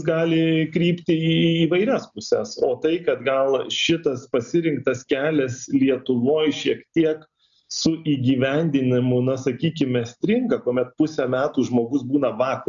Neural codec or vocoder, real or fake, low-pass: none; real; 7.2 kHz